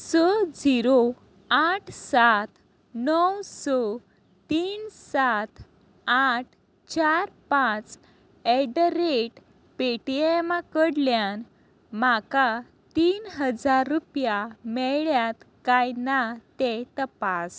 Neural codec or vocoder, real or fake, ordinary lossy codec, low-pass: none; real; none; none